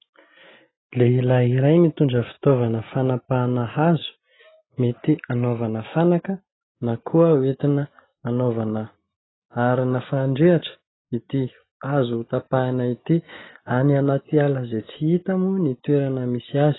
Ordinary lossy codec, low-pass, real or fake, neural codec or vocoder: AAC, 16 kbps; 7.2 kHz; real; none